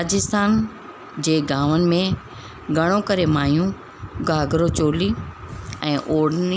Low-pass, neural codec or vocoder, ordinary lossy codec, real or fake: none; none; none; real